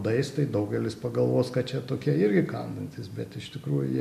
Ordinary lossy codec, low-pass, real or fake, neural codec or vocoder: MP3, 64 kbps; 14.4 kHz; real; none